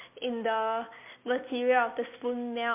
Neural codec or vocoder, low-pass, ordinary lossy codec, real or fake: none; 3.6 kHz; MP3, 32 kbps; real